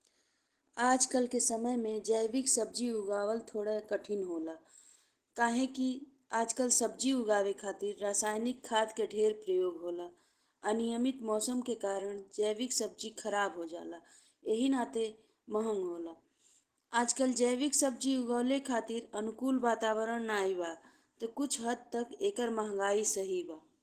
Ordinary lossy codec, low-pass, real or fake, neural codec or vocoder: Opus, 16 kbps; 14.4 kHz; real; none